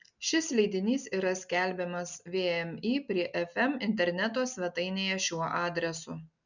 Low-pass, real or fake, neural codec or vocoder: 7.2 kHz; real; none